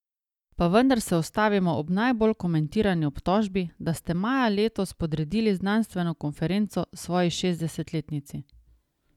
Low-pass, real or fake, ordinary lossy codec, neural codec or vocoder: 19.8 kHz; real; none; none